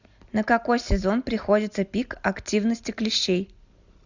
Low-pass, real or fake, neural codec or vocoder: 7.2 kHz; real; none